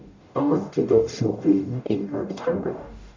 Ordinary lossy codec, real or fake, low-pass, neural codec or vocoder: MP3, 64 kbps; fake; 7.2 kHz; codec, 44.1 kHz, 0.9 kbps, DAC